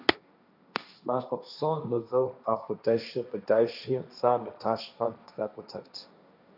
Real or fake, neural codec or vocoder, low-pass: fake; codec, 16 kHz, 1.1 kbps, Voila-Tokenizer; 5.4 kHz